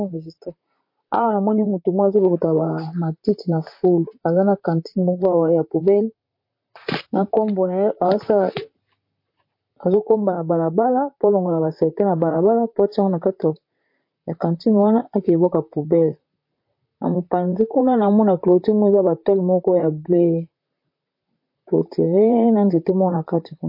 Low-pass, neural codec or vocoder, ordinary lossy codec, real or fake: 5.4 kHz; vocoder, 44.1 kHz, 128 mel bands, Pupu-Vocoder; MP3, 32 kbps; fake